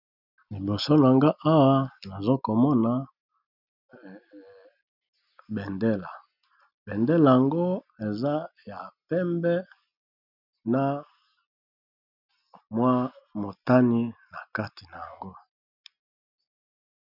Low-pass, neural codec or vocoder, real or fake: 5.4 kHz; none; real